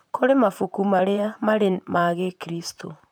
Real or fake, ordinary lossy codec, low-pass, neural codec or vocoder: fake; none; none; vocoder, 44.1 kHz, 128 mel bands, Pupu-Vocoder